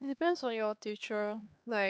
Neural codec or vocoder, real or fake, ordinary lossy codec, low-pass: codec, 16 kHz, 2 kbps, X-Codec, HuBERT features, trained on LibriSpeech; fake; none; none